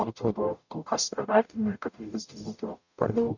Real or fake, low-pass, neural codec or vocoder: fake; 7.2 kHz; codec, 44.1 kHz, 0.9 kbps, DAC